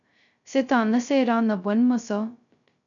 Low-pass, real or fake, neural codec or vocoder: 7.2 kHz; fake; codec, 16 kHz, 0.2 kbps, FocalCodec